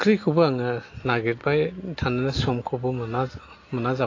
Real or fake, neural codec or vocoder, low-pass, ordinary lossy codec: real; none; 7.2 kHz; AAC, 32 kbps